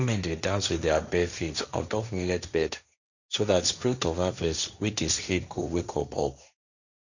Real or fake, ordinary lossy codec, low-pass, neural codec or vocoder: fake; none; 7.2 kHz; codec, 16 kHz, 1.1 kbps, Voila-Tokenizer